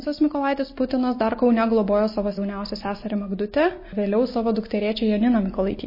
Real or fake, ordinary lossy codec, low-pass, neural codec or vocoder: real; MP3, 24 kbps; 5.4 kHz; none